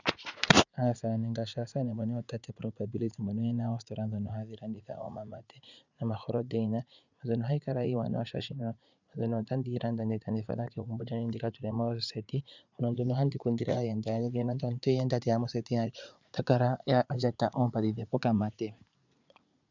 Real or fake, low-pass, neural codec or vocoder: fake; 7.2 kHz; vocoder, 44.1 kHz, 80 mel bands, Vocos